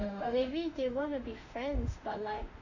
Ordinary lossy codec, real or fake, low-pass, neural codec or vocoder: none; fake; 7.2 kHz; autoencoder, 48 kHz, 32 numbers a frame, DAC-VAE, trained on Japanese speech